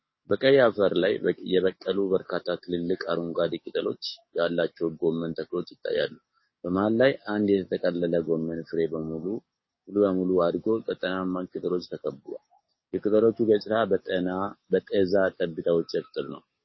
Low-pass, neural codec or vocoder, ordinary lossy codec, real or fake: 7.2 kHz; codec, 44.1 kHz, 7.8 kbps, DAC; MP3, 24 kbps; fake